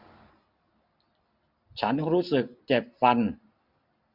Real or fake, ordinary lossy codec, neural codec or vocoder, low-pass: real; AAC, 48 kbps; none; 5.4 kHz